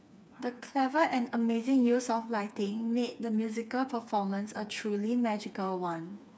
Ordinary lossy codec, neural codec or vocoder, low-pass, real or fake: none; codec, 16 kHz, 4 kbps, FreqCodec, smaller model; none; fake